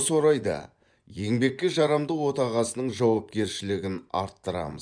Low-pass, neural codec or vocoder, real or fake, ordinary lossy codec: 9.9 kHz; vocoder, 24 kHz, 100 mel bands, Vocos; fake; none